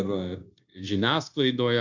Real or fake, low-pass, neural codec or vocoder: fake; 7.2 kHz; codec, 16 kHz, 0.9 kbps, LongCat-Audio-Codec